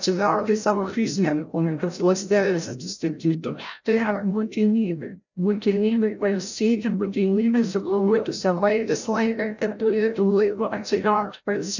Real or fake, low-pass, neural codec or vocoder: fake; 7.2 kHz; codec, 16 kHz, 0.5 kbps, FreqCodec, larger model